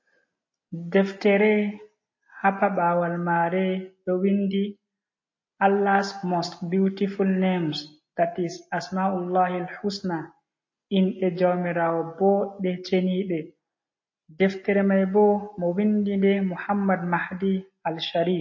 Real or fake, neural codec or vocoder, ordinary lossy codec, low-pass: real; none; MP3, 32 kbps; 7.2 kHz